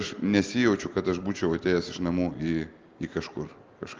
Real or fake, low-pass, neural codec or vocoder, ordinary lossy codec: real; 7.2 kHz; none; Opus, 32 kbps